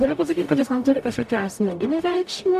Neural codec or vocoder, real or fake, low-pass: codec, 44.1 kHz, 0.9 kbps, DAC; fake; 14.4 kHz